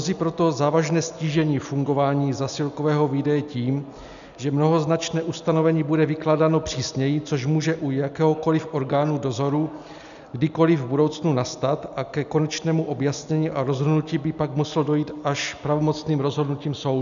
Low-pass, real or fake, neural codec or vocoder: 7.2 kHz; real; none